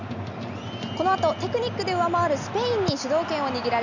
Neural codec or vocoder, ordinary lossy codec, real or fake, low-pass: none; none; real; 7.2 kHz